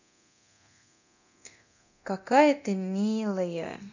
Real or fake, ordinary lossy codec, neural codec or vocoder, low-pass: fake; none; codec, 24 kHz, 0.9 kbps, DualCodec; 7.2 kHz